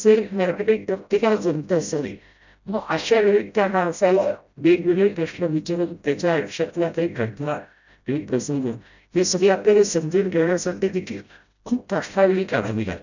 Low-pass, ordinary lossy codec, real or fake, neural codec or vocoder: 7.2 kHz; none; fake; codec, 16 kHz, 0.5 kbps, FreqCodec, smaller model